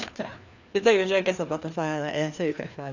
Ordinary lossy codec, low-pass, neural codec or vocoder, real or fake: none; 7.2 kHz; codec, 16 kHz, 2 kbps, FreqCodec, larger model; fake